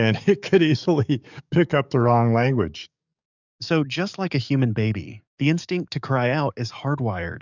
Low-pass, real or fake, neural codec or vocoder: 7.2 kHz; fake; codec, 44.1 kHz, 7.8 kbps, DAC